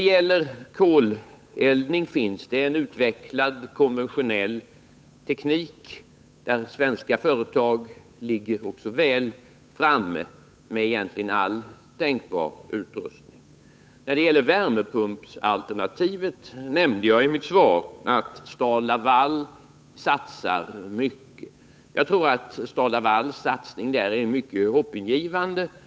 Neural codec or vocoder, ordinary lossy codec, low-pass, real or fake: codec, 16 kHz, 8 kbps, FunCodec, trained on Chinese and English, 25 frames a second; none; none; fake